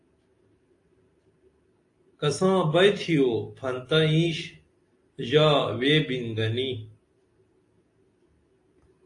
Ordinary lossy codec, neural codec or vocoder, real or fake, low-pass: AAC, 48 kbps; none; real; 10.8 kHz